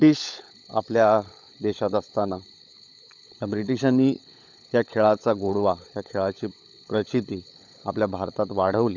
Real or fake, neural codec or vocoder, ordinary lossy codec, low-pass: fake; codec, 16 kHz, 16 kbps, FunCodec, trained on LibriTTS, 50 frames a second; none; 7.2 kHz